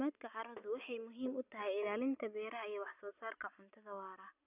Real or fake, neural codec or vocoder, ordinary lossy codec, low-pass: real; none; none; 3.6 kHz